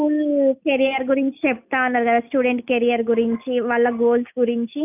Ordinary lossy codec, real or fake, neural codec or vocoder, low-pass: none; real; none; 3.6 kHz